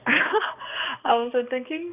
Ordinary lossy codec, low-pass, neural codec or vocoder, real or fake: none; 3.6 kHz; codec, 16 kHz, 2 kbps, X-Codec, HuBERT features, trained on balanced general audio; fake